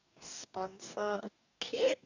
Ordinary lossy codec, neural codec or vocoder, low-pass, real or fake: none; codec, 44.1 kHz, 2.6 kbps, DAC; 7.2 kHz; fake